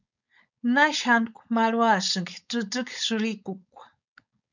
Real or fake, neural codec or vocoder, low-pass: fake; codec, 16 kHz, 4.8 kbps, FACodec; 7.2 kHz